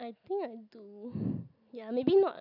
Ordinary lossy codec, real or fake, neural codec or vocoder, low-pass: none; real; none; 5.4 kHz